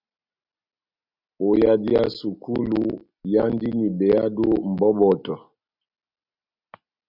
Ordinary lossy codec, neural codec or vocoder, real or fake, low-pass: Opus, 64 kbps; none; real; 5.4 kHz